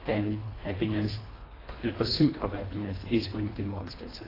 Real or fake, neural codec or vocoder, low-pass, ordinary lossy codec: fake; codec, 24 kHz, 1.5 kbps, HILCodec; 5.4 kHz; AAC, 24 kbps